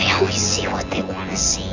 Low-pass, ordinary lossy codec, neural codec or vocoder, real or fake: 7.2 kHz; AAC, 48 kbps; vocoder, 24 kHz, 100 mel bands, Vocos; fake